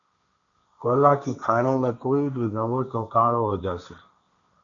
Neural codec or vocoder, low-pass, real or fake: codec, 16 kHz, 1.1 kbps, Voila-Tokenizer; 7.2 kHz; fake